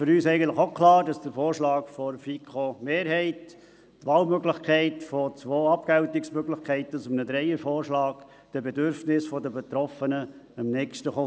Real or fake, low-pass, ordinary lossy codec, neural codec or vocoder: real; none; none; none